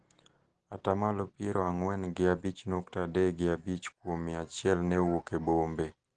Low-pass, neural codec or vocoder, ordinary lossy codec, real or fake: 9.9 kHz; none; Opus, 16 kbps; real